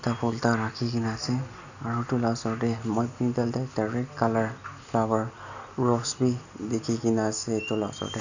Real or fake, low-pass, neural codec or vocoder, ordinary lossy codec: real; 7.2 kHz; none; none